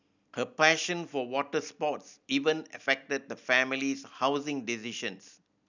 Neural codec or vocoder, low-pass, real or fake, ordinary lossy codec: none; 7.2 kHz; real; none